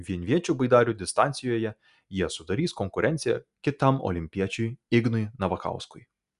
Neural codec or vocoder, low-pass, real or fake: none; 10.8 kHz; real